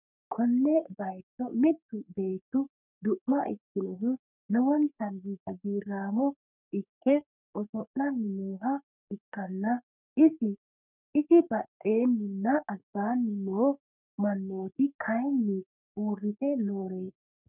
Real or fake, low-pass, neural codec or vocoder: fake; 3.6 kHz; codec, 44.1 kHz, 3.4 kbps, Pupu-Codec